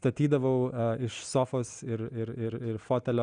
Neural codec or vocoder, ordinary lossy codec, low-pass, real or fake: none; Opus, 64 kbps; 9.9 kHz; real